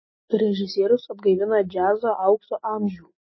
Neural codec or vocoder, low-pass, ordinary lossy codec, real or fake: none; 7.2 kHz; MP3, 24 kbps; real